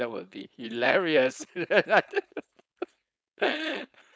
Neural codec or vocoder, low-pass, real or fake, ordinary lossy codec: codec, 16 kHz, 4.8 kbps, FACodec; none; fake; none